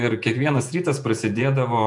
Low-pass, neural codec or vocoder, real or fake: 10.8 kHz; vocoder, 48 kHz, 128 mel bands, Vocos; fake